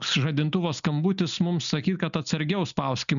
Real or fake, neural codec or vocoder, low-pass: real; none; 7.2 kHz